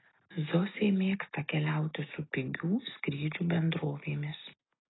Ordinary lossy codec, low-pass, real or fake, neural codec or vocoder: AAC, 16 kbps; 7.2 kHz; real; none